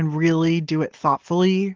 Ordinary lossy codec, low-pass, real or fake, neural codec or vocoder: Opus, 16 kbps; 7.2 kHz; real; none